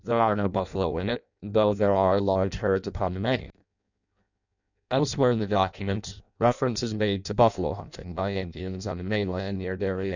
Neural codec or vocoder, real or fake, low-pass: codec, 16 kHz in and 24 kHz out, 0.6 kbps, FireRedTTS-2 codec; fake; 7.2 kHz